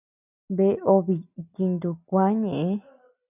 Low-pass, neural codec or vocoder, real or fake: 3.6 kHz; none; real